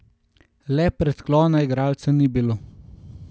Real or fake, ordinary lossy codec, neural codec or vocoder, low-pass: real; none; none; none